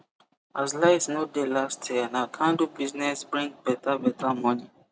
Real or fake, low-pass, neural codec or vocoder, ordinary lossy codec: real; none; none; none